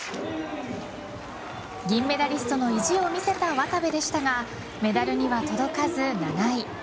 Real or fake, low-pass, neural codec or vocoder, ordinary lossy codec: real; none; none; none